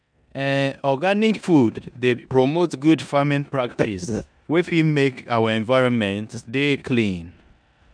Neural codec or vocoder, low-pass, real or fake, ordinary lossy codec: codec, 16 kHz in and 24 kHz out, 0.9 kbps, LongCat-Audio-Codec, four codebook decoder; 9.9 kHz; fake; none